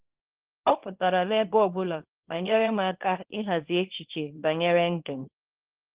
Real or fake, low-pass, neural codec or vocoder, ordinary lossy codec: fake; 3.6 kHz; codec, 24 kHz, 0.9 kbps, WavTokenizer, small release; Opus, 16 kbps